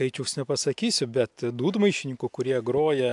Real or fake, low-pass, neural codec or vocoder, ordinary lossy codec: real; 10.8 kHz; none; AAC, 64 kbps